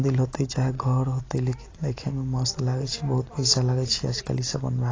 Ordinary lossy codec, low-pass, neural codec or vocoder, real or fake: AAC, 32 kbps; 7.2 kHz; none; real